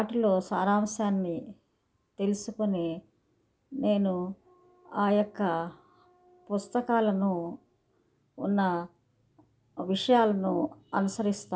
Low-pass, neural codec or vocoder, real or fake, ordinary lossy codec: none; none; real; none